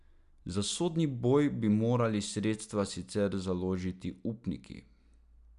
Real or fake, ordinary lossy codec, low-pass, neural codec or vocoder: real; none; 10.8 kHz; none